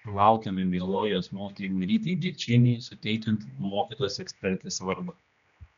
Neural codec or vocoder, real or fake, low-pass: codec, 16 kHz, 1 kbps, X-Codec, HuBERT features, trained on general audio; fake; 7.2 kHz